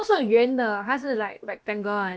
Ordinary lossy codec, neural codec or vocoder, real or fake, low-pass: none; codec, 16 kHz, about 1 kbps, DyCAST, with the encoder's durations; fake; none